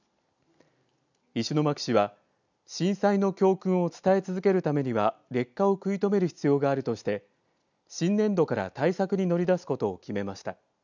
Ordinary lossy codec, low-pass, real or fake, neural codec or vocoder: none; 7.2 kHz; real; none